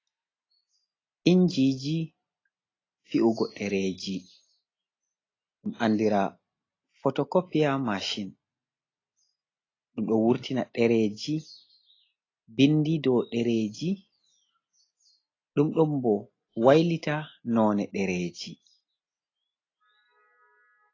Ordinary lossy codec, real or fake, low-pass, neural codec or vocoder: AAC, 32 kbps; real; 7.2 kHz; none